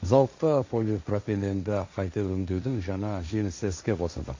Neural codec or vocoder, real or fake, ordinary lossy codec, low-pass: codec, 16 kHz, 1.1 kbps, Voila-Tokenizer; fake; MP3, 48 kbps; 7.2 kHz